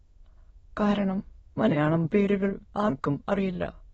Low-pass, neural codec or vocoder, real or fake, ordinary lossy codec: 9.9 kHz; autoencoder, 22.05 kHz, a latent of 192 numbers a frame, VITS, trained on many speakers; fake; AAC, 24 kbps